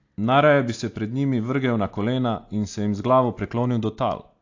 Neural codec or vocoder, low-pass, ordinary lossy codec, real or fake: none; 7.2 kHz; AAC, 48 kbps; real